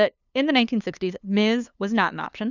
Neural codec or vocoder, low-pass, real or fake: codec, 16 kHz, 2 kbps, FunCodec, trained on LibriTTS, 25 frames a second; 7.2 kHz; fake